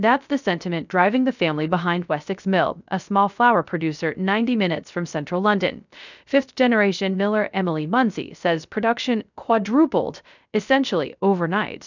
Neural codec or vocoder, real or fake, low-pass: codec, 16 kHz, 0.3 kbps, FocalCodec; fake; 7.2 kHz